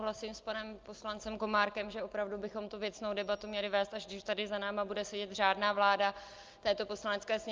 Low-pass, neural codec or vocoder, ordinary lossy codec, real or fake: 7.2 kHz; none; Opus, 24 kbps; real